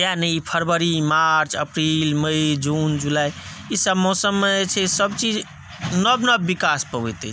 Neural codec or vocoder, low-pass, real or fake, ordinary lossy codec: none; none; real; none